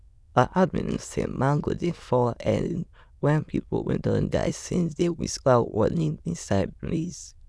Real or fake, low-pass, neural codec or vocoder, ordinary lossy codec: fake; none; autoencoder, 22.05 kHz, a latent of 192 numbers a frame, VITS, trained on many speakers; none